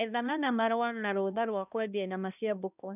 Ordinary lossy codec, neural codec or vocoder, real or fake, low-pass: none; codec, 16 kHz, 1 kbps, X-Codec, HuBERT features, trained on balanced general audio; fake; 3.6 kHz